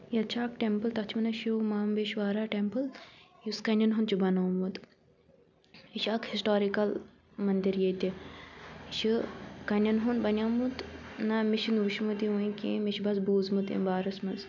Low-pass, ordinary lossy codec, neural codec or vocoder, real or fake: 7.2 kHz; none; none; real